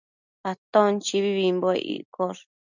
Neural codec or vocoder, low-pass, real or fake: none; 7.2 kHz; real